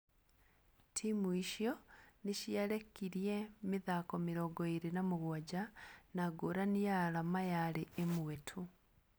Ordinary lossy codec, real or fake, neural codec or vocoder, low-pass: none; real; none; none